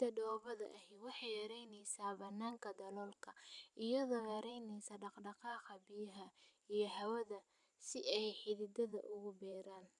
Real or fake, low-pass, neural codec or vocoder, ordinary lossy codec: fake; 10.8 kHz; vocoder, 44.1 kHz, 128 mel bands every 512 samples, BigVGAN v2; none